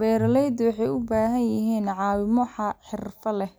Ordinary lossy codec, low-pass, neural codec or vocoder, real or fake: none; none; none; real